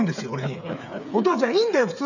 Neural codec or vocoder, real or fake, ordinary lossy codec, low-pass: codec, 16 kHz, 8 kbps, FreqCodec, smaller model; fake; none; 7.2 kHz